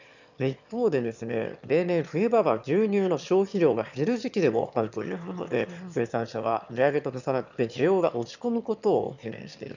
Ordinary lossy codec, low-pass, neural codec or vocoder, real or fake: none; 7.2 kHz; autoencoder, 22.05 kHz, a latent of 192 numbers a frame, VITS, trained on one speaker; fake